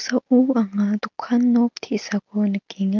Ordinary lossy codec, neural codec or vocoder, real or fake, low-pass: Opus, 32 kbps; none; real; 7.2 kHz